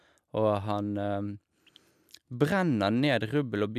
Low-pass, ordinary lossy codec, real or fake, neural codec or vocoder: 14.4 kHz; none; real; none